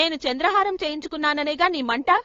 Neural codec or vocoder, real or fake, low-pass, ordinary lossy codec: codec, 16 kHz, 8 kbps, FunCodec, trained on LibriTTS, 25 frames a second; fake; 7.2 kHz; AAC, 32 kbps